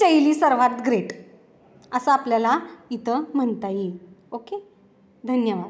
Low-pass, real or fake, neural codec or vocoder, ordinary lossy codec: none; real; none; none